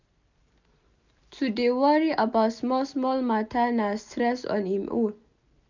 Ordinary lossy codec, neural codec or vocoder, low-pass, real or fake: none; none; 7.2 kHz; real